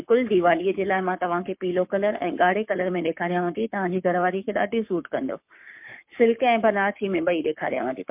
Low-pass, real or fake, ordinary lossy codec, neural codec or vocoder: 3.6 kHz; fake; MP3, 32 kbps; codec, 44.1 kHz, 7.8 kbps, Pupu-Codec